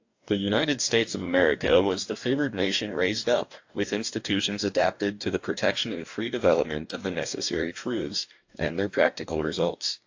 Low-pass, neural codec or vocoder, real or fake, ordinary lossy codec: 7.2 kHz; codec, 44.1 kHz, 2.6 kbps, DAC; fake; AAC, 48 kbps